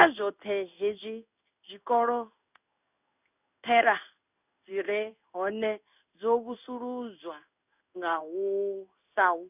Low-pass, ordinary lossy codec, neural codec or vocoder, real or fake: 3.6 kHz; none; codec, 16 kHz in and 24 kHz out, 1 kbps, XY-Tokenizer; fake